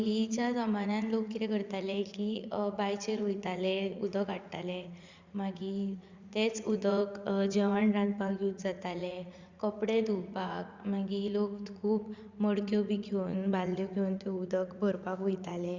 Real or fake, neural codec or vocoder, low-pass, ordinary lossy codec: fake; vocoder, 44.1 kHz, 80 mel bands, Vocos; 7.2 kHz; Opus, 64 kbps